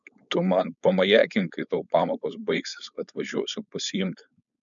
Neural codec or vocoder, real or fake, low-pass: codec, 16 kHz, 4.8 kbps, FACodec; fake; 7.2 kHz